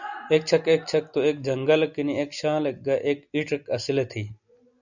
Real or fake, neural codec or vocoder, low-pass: real; none; 7.2 kHz